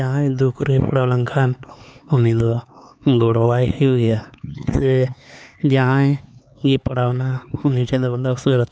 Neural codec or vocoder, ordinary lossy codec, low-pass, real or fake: codec, 16 kHz, 4 kbps, X-Codec, HuBERT features, trained on LibriSpeech; none; none; fake